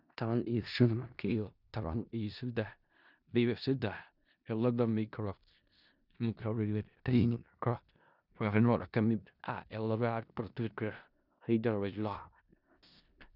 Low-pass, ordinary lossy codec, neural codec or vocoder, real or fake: 5.4 kHz; none; codec, 16 kHz in and 24 kHz out, 0.4 kbps, LongCat-Audio-Codec, four codebook decoder; fake